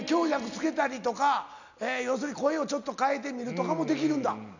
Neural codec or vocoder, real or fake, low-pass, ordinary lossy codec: none; real; 7.2 kHz; none